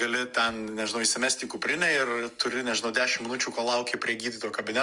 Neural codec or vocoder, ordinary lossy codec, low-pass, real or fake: none; Opus, 32 kbps; 10.8 kHz; real